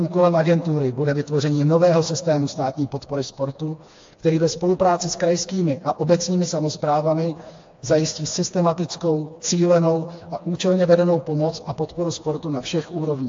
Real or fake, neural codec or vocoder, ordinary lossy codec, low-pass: fake; codec, 16 kHz, 2 kbps, FreqCodec, smaller model; AAC, 48 kbps; 7.2 kHz